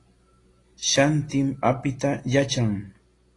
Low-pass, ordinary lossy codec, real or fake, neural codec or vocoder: 10.8 kHz; AAC, 32 kbps; real; none